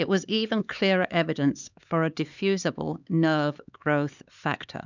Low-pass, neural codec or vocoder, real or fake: 7.2 kHz; codec, 16 kHz, 4 kbps, X-Codec, HuBERT features, trained on LibriSpeech; fake